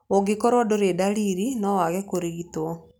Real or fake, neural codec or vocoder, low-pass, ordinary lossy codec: real; none; none; none